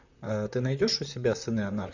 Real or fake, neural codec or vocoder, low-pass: fake; vocoder, 44.1 kHz, 128 mel bands, Pupu-Vocoder; 7.2 kHz